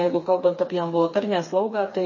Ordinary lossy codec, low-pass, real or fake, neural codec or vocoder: MP3, 32 kbps; 7.2 kHz; fake; codec, 16 kHz, 4 kbps, FreqCodec, smaller model